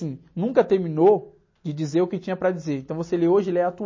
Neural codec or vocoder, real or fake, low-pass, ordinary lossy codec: none; real; 7.2 kHz; MP3, 32 kbps